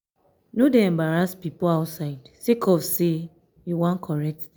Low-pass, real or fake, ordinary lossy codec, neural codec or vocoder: none; real; none; none